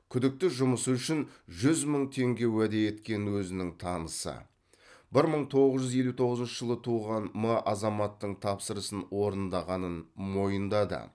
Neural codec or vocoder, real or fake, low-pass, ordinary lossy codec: none; real; none; none